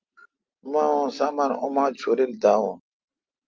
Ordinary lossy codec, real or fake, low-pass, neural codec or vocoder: Opus, 24 kbps; real; 7.2 kHz; none